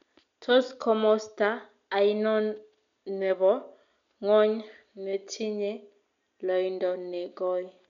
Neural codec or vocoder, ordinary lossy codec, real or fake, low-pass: none; MP3, 64 kbps; real; 7.2 kHz